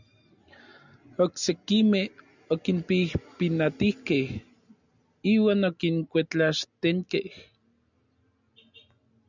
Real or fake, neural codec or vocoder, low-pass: real; none; 7.2 kHz